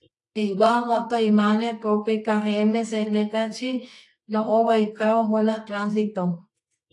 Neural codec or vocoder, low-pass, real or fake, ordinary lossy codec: codec, 24 kHz, 0.9 kbps, WavTokenizer, medium music audio release; 10.8 kHz; fake; AAC, 48 kbps